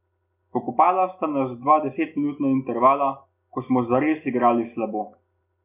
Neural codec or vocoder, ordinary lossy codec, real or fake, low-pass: none; none; real; 3.6 kHz